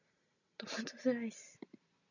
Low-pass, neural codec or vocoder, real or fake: 7.2 kHz; none; real